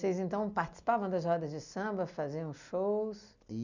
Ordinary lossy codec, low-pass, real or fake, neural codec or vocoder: none; 7.2 kHz; real; none